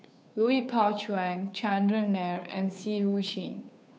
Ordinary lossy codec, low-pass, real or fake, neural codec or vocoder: none; none; fake; codec, 16 kHz, 2 kbps, FunCodec, trained on Chinese and English, 25 frames a second